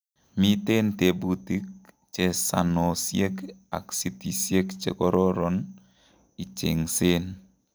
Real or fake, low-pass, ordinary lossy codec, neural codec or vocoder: real; none; none; none